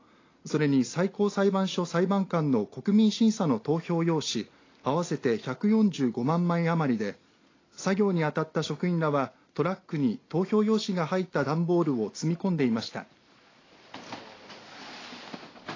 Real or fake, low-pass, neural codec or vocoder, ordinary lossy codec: real; 7.2 kHz; none; AAC, 32 kbps